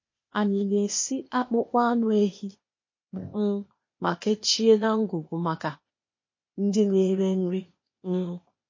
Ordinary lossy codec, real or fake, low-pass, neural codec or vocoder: MP3, 32 kbps; fake; 7.2 kHz; codec, 16 kHz, 0.8 kbps, ZipCodec